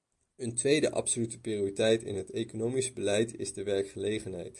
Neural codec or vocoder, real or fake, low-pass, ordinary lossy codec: none; real; 10.8 kHz; MP3, 64 kbps